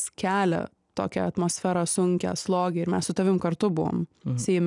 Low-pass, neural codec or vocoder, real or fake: 10.8 kHz; none; real